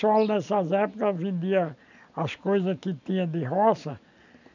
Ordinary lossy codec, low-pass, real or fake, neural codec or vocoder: none; 7.2 kHz; real; none